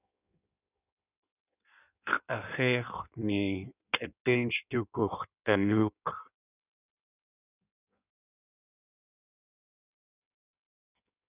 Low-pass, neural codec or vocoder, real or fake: 3.6 kHz; codec, 16 kHz in and 24 kHz out, 1.1 kbps, FireRedTTS-2 codec; fake